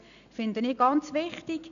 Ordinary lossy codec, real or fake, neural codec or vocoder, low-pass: none; real; none; 7.2 kHz